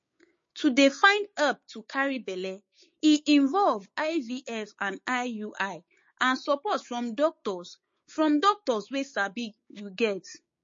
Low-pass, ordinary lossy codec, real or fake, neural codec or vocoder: 7.2 kHz; MP3, 32 kbps; fake; codec, 16 kHz, 6 kbps, DAC